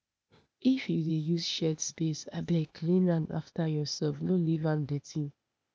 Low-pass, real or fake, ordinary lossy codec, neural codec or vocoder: none; fake; none; codec, 16 kHz, 0.8 kbps, ZipCodec